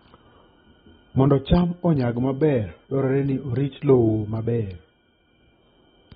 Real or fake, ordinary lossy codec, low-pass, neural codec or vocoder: real; AAC, 16 kbps; 19.8 kHz; none